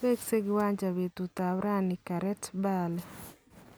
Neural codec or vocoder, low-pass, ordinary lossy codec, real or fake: none; none; none; real